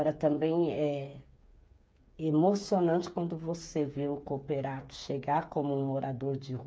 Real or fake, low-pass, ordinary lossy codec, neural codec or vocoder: fake; none; none; codec, 16 kHz, 8 kbps, FreqCodec, smaller model